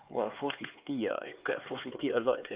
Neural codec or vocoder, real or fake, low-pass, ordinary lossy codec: codec, 16 kHz, 4 kbps, X-Codec, HuBERT features, trained on LibriSpeech; fake; 3.6 kHz; Opus, 24 kbps